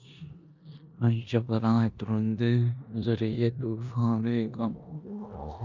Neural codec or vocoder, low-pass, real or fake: codec, 16 kHz in and 24 kHz out, 0.9 kbps, LongCat-Audio-Codec, four codebook decoder; 7.2 kHz; fake